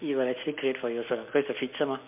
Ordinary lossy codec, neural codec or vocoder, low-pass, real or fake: MP3, 32 kbps; none; 3.6 kHz; real